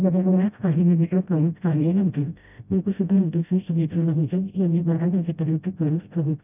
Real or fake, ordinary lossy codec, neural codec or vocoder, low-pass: fake; none; codec, 16 kHz, 0.5 kbps, FreqCodec, smaller model; 3.6 kHz